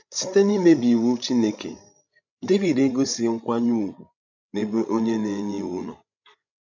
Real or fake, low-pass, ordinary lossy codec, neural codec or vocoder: fake; 7.2 kHz; none; codec, 16 kHz, 16 kbps, FreqCodec, larger model